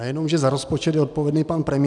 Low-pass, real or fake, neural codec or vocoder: 10.8 kHz; real; none